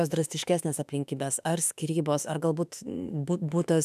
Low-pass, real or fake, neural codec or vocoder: 14.4 kHz; fake; autoencoder, 48 kHz, 32 numbers a frame, DAC-VAE, trained on Japanese speech